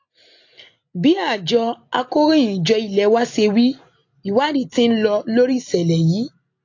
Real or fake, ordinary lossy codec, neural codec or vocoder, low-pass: real; AAC, 48 kbps; none; 7.2 kHz